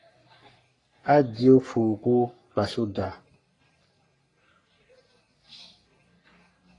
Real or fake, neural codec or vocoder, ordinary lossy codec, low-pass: fake; codec, 44.1 kHz, 3.4 kbps, Pupu-Codec; AAC, 32 kbps; 10.8 kHz